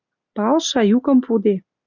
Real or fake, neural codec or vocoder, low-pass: real; none; 7.2 kHz